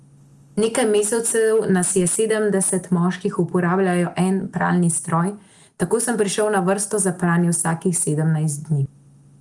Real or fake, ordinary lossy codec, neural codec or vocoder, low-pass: real; Opus, 32 kbps; none; 10.8 kHz